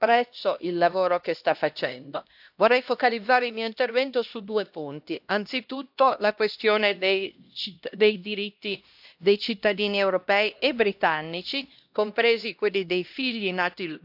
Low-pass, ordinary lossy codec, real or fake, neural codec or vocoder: 5.4 kHz; AAC, 48 kbps; fake; codec, 16 kHz, 1 kbps, X-Codec, HuBERT features, trained on LibriSpeech